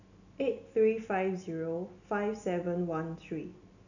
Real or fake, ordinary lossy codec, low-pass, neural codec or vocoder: real; none; 7.2 kHz; none